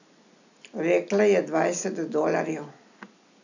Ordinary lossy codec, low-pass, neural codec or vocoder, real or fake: none; 7.2 kHz; none; real